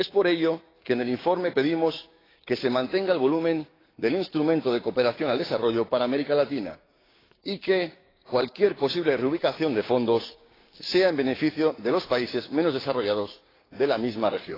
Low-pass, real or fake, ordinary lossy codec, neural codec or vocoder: 5.4 kHz; fake; AAC, 24 kbps; codec, 16 kHz, 6 kbps, DAC